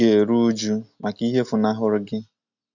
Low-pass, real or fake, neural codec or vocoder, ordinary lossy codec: 7.2 kHz; real; none; none